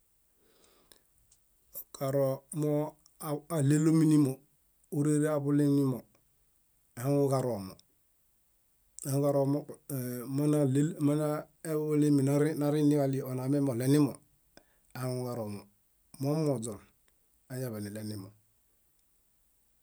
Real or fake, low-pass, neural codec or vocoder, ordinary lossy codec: real; none; none; none